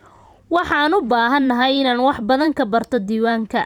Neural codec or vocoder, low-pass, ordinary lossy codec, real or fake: vocoder, 44.1 kHz, 128 mel bands every 512 samples, BigVGAN v2; 19.8 kHz; none; fake